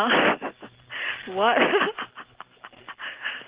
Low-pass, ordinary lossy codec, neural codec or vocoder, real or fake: 3.6 kHz; Opus, 16 kbps; none; real